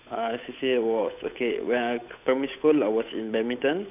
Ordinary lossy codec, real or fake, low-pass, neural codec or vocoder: none; fake; 3.6 kHz; codec, 16 kHz, 8 kbps, FunCodec, trained on Chinese and English, 25 frames a second